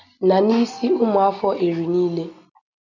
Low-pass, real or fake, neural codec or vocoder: 7.2 kHz; real; none